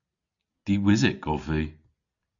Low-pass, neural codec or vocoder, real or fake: 7.2 kHz; none; real